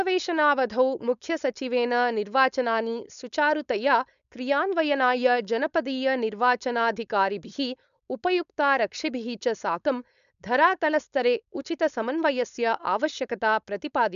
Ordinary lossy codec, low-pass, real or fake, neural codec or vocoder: none; 7.2 kHz; fake; codec, 16 kHz, 4.8 kbps, FACodec